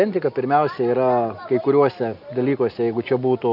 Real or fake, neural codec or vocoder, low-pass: real; none; 5.4 kHz